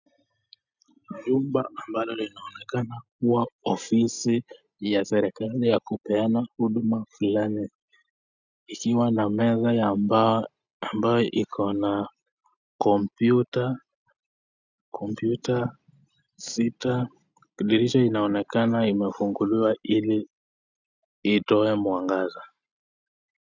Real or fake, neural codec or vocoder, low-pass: real; none; 7.2 kHz